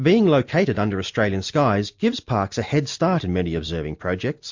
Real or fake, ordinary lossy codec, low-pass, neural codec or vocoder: real; MP3, 48 kbps; 7.2 kHz; none